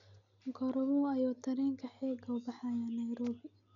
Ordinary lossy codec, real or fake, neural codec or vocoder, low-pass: none; real; none; 7.2 kHz